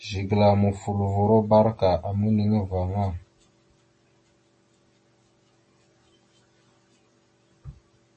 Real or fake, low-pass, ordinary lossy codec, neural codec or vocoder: real; 10.8 kHz; MP3, 32 kbps; none